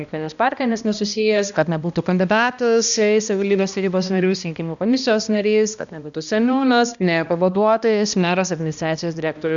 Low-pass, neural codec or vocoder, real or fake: 7.2 kHz; codec, 16 kHz, 1 kbps, X-Codec, HuBERT features, trained on balanced general audio; fake